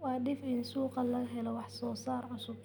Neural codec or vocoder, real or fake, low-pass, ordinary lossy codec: none; real; none; none